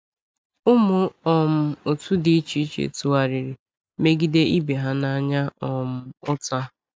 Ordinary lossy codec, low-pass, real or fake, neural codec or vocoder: none; none; real; none